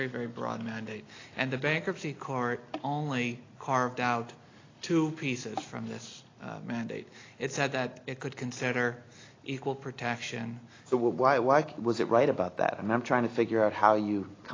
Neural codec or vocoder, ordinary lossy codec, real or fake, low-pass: none; AAC, 32 kbps; real; 7.2 kHz